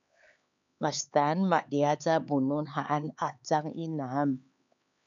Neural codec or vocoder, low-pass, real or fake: codec, 16 kHz, 4 kbps, X-Codec, HuBERT features, trained on LibriSpeech; 7.2 kHz; fake